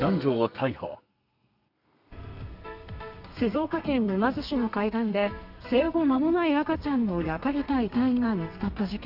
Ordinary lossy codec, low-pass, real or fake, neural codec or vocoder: none; 5.4 kHz; fake; codec, 32 kHz, 1.9 kbps, SNAC